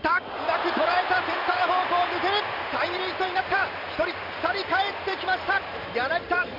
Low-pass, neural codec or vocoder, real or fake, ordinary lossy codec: 5.4 kHz; vocoder, 44.1 kHz, 128 mel bands every 512 samples, BigVGAN v2; fake; none